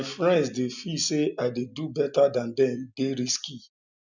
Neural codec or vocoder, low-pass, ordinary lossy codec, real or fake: none; 7.2 kHz; none; real